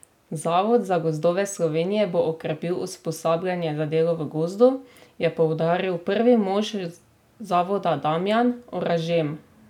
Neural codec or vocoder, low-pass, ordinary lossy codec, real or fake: none; 19.8 kHz; none; real